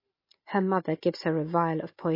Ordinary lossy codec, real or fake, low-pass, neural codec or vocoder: MP3, 24 kbps; real; 5.4 kHz; none